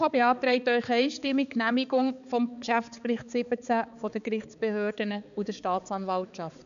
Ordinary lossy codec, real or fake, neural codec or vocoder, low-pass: none; fake; codec, 16 kHz, 4 kbps, X-Codec, HuBERT features, trained on balanced general audio; 7.2 kHz